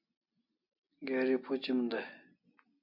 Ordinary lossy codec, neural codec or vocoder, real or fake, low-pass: AAC, 48 kbps; none; real; 5.4 kHz